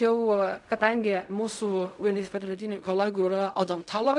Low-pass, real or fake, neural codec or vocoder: 10.8 kHz; fake; codec, 16 kHz in and 24 kHz out, 0.4 kbps, LongCat-Audio-Codec, fine tuned four codebook decoder